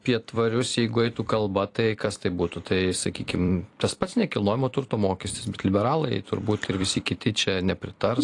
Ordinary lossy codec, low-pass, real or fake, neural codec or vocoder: AAC, 48 kbps; 10.8 kHz; fake; vocoder, 44.1 kHz, 128 mel bands every 256 samples, BigVGAN v2